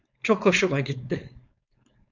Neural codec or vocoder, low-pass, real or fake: codec, 16 kHz, 4.8 kbps, FACodec; 7.2 kHz; fake